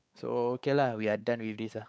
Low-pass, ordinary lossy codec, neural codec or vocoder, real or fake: none; none; codec, 16 kHz, 4 kbps, X-Codec, WavLM features, trained on Multilingual LibriSpeech; fake